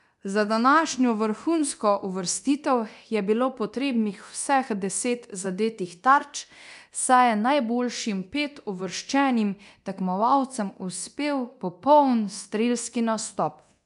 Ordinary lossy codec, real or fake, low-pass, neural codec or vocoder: none; fake; 10.8 kHz; codec, 24 kHz, 0.9 kbps, DualCodec